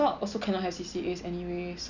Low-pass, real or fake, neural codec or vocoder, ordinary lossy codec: 7.2 kHz; real; none; none